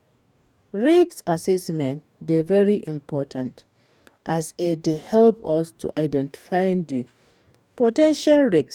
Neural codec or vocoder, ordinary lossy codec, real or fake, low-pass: codec, 44.1 kHz, 2.6 kbps, DAC; none; fake; 19.8 kHz